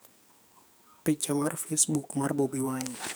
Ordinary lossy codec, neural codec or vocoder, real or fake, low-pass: none; codec, 44.1 kHz, 2.6 kbps, SNAC; fake; none